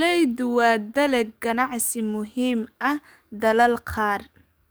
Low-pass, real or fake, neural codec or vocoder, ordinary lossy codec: none; fake; codec, 44.1 kHz, 7.8 kbps, DAC; none